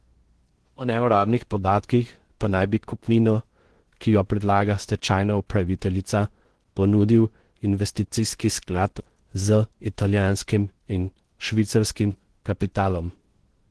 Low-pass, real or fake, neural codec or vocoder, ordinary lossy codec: 10.8 kHz; fake; codec, 16 kHz in and 24 kHz out, 0.6 kbps, FocalCodec, streaming, 2048 codes; Opus, 16 kbps